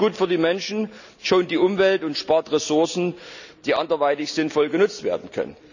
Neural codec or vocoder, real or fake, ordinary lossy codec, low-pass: none; real; none; 7.2 kHz